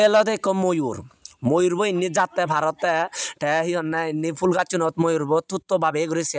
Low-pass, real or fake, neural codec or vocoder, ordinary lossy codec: none; real; none; none